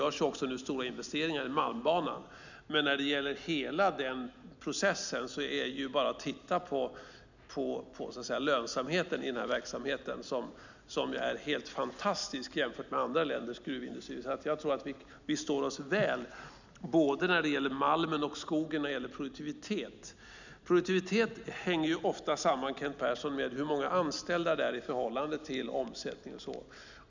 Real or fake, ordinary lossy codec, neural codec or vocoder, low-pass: real; none; none; 7.2 kHz